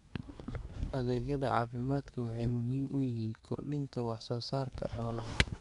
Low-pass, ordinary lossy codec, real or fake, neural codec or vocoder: 10.8 kHz; none; fake; codec, 24 kHz, 1 kbps, SNAC